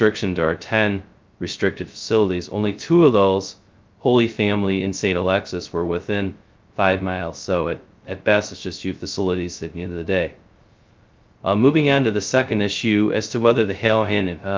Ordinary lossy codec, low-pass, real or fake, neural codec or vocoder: Opus, 24 kbps; 7.2 kHz; fake; codec, 16 kHz, 0.2 kbps, FocalCodec